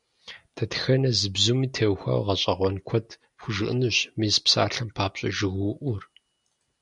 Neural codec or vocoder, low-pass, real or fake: none; 10.8 kHz; real